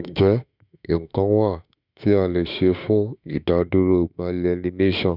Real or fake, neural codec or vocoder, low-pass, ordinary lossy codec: fake; autoencoder, 48 kHz, 32 numbers a frame, DAC-VAE, trained on Japanese speech; 5.4 kHz; none